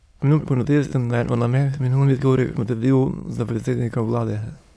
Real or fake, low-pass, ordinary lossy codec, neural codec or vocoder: fake; none; none; autoencoder, 22.05 kHz, a latent of 192 numbers a frame, VITS, trained on many speakers